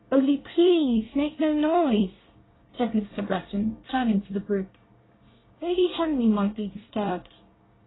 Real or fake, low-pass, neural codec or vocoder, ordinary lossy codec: fake; 7.2 kHz; codec, 24 kHz, 1 kbps, SNAC; AAC, 16 kbps